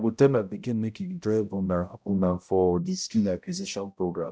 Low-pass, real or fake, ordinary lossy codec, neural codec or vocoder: none; fake; none; codec, 16 kHz, 0.5 kbps, X-Codec, HuBERT features, trained on balanced general audio